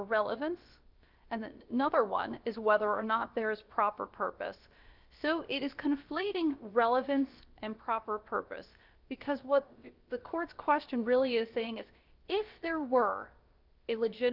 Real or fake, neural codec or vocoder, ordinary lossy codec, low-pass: fake; codec, 16 kHz, about 1 kbps, DyCAST, with the encoder's durations; Opus, 24 kbps; 5.4 kHz